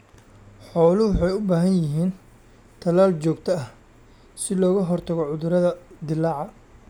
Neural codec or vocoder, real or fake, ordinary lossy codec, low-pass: none; real; none; 19.8 kHz